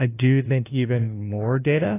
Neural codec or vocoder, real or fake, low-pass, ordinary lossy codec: codec, 16 kHz, 0.5 kbps, FunCodec, trained on LibriTTS, 25 frames a second; fake; 3.6 kHz; AAC, 16 kbps